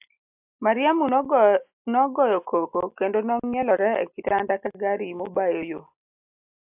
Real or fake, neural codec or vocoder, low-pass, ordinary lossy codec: real; none; 3.6 kHz; AAC, 32 kbps